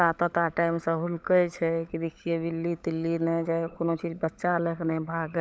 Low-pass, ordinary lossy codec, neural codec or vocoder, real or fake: none; none; codec, 16 kHz, 16 kbps, FunCodec, trained on LibriTTS, 50 frames a second; fake